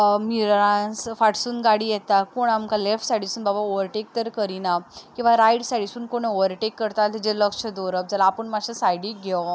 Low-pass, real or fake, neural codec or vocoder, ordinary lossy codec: none; real; none; none